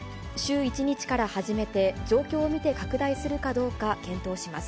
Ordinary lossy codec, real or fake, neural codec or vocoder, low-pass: none; real; none; none